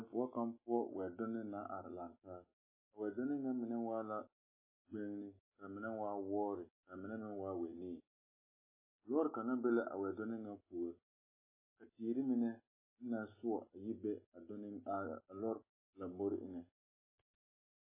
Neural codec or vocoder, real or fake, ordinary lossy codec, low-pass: none; real; MP3, 16 kbps; 3.6 kHz